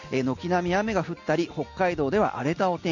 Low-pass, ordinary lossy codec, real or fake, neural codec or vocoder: 7.2 kHz; none; real; none